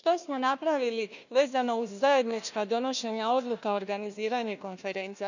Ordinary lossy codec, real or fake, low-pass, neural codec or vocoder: none; fake; 7.2 kHz; codec, 16 kHz, 1 kbps, FunCodec, trained on Chinese and English, 50 frames a second